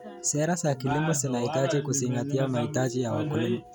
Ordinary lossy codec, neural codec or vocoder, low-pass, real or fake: none; none; none; real